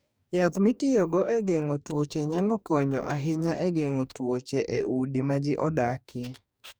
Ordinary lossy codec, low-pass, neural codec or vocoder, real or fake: none; none; codec, 44.1 kHz, 2.6 kbps, DAC; fake